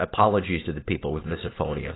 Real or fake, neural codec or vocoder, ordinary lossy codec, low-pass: real; none; AAC, 16 kbps; 7.2 kHz